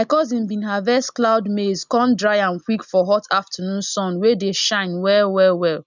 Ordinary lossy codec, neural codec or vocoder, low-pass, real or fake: none; none; 7.2 kHz; real